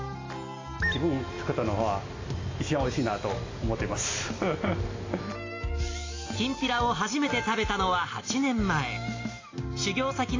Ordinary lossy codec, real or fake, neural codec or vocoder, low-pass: AAC, 32 kbps; real; none; 7.2 kHz